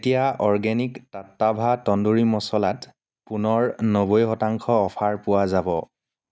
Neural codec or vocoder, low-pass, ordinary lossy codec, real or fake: none; none; none; real